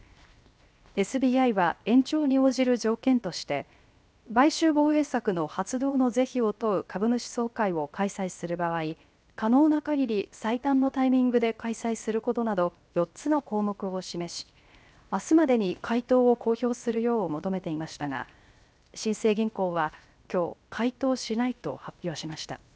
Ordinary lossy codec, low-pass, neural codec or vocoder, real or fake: none; none; codec, 16 kHz, 0.7 kbps, FocalCodec; fake